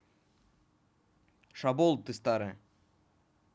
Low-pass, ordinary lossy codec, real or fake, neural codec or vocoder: none; none; real; none